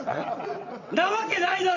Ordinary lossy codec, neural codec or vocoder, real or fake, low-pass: none; vocoder, 22.05 kHz, 80 mel bands, WaveNeXt; fake; 7.2 kHz